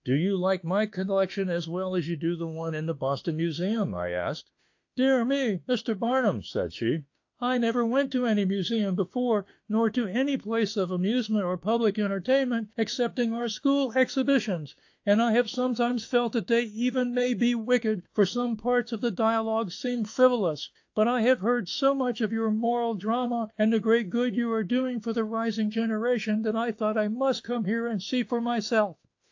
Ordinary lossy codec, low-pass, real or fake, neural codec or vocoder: AAC, 48 kbps; 7.2 kHz; fake; autoencoder, 48 kHz, 32 numbers a frame, DAC-VAE, trained on Japanese speech